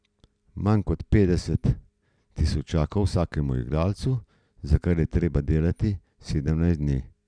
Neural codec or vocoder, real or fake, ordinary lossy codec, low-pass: none; real; none; 9.9 kHz